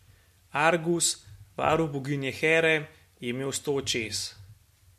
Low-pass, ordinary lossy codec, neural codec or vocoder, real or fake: 14.4 kHz; MP3, 64 kbps; none; real